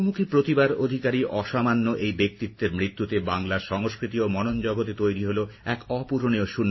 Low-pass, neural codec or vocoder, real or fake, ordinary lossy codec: 7.2 kHz; none; real; MP3, 24 kbps